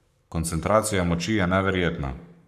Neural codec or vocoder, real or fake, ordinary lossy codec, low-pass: codec, 44.1 kHz, 7.8 kbps, Pupu-Codec; fake; none; 14.4 kHz